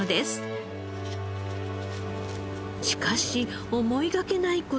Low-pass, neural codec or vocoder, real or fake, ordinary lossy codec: none; none; real; none